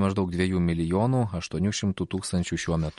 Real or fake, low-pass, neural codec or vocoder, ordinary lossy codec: real; 19.8 kHz; none; MP3, 48 kbps